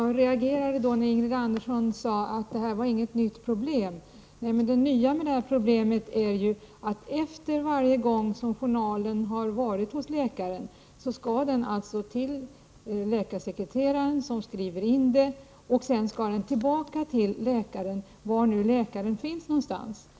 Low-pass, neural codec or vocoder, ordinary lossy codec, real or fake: none; none; none; real